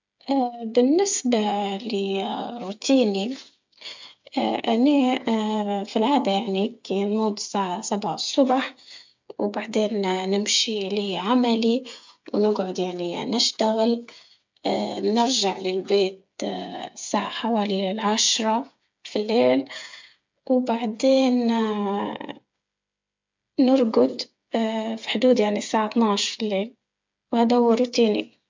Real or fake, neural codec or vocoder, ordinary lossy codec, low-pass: fake; codec, 16 kHz, 8 kbps, FreqCodec, smaller model; MP3, 64 kbps; 7.2 kHz